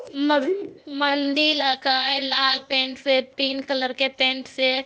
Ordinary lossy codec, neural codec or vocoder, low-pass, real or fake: none; codec, 16 kHz, 0.8 kbps, ZipCodec; none; fake